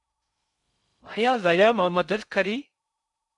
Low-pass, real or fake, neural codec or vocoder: 10.8 kHz; fake; codec, 16 kHz in and 24 kHz out, 0.6 kbps, FocalCodec, streaming, 2048 codes